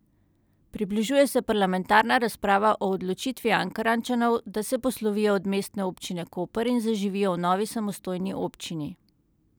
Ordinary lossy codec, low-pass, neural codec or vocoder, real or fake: none; none; none; real